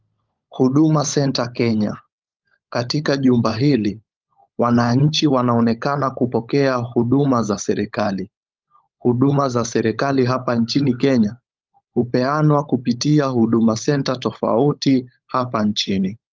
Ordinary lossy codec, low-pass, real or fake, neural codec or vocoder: Opus, 24 kbps; 7.2 kHz; fake; codec, 16 kHz, 16 kbps, FunCodec, trained on LibriTTS, 50 frames a second